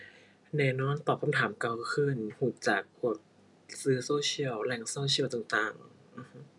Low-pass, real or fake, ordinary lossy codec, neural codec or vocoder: 10.8 kHz; real; none; none